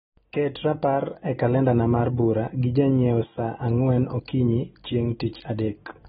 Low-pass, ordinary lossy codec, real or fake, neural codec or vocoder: 19.8 kHz; AAC, 16 kbps; real; none